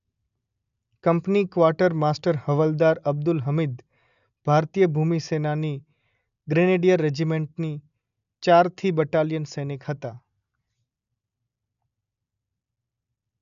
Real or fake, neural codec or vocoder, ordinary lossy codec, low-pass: real; none; none; 7.2 kHz